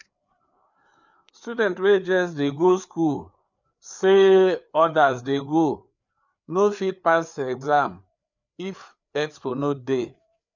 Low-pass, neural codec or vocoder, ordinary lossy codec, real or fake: 7.2 kHz; codec, 16 kHz, 4 kbps, FreqCodec, larger model; none; fake